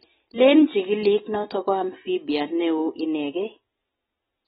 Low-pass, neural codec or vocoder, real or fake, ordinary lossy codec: 7.2 kHz; none; real; AAC, 16 kbps